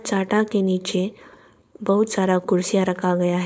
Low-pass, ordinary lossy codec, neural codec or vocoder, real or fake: none; none; codec, 16 kHz, 4.8 kbps, FACodec; fake